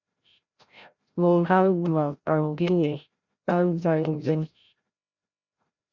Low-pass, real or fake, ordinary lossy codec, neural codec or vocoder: 7.2 kHz; fake; Opus, 64 kbps; codec, 16 kHz, 0.5 kbps, FreqCodec, larger model